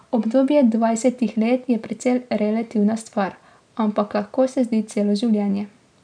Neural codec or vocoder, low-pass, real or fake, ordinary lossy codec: none; 9.9 kHz; real; none